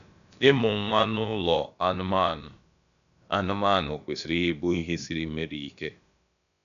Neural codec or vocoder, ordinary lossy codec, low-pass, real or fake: codec, 16 kHz, about 1 kbps, DyCAST, with the encoder's durations; none; 7.2 kHz; fake